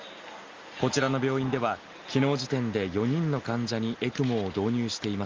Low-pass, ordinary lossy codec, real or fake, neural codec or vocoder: 7.2 kHz; Opus, 32 kbps; real; none